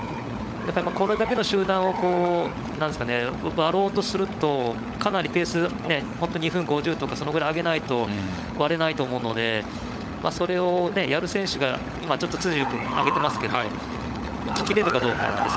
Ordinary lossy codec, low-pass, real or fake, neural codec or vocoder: none; none; fake; codec, 16 kHz, 16 kbps, FunCodec, trained on LibriTTS, 50 frames a second